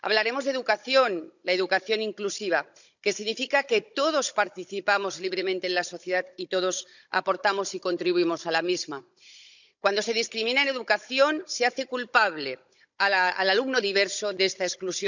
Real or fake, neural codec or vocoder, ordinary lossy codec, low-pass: fake; codec, 16 kHz, 16 kbps, FunCodec, trained on Chinese and English, 50 frames a second; none; 7.2 kHz